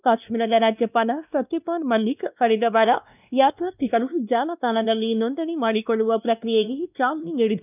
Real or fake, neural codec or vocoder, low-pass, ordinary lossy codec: fake; codec, 16 kHz, 1 kbps, X-Codec, WavLM features, trained on Multilingual LibriSpeech; 3.6 kHz; none